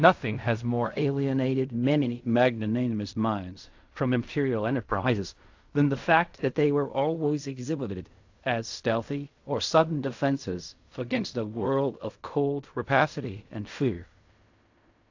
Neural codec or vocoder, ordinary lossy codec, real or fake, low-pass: codec, 16 kHz in and 24 kHz out, 0.4 kbps, LongCat-Audio-Codec, fine tuned four codebook decoder; AAC, 48 kbps; fake; 7.2 kHz